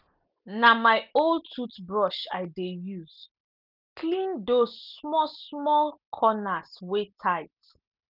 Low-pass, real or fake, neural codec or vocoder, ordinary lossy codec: 5.4 kHz; real; none; none